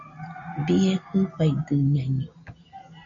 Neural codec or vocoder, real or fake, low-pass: none; real; 7.2 kHz